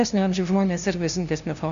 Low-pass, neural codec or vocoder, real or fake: 7.2 kHz; codec, 16 kHz, 0.5 kbps, FunCodec, trained on LibriTTS, 25 frames a second; fake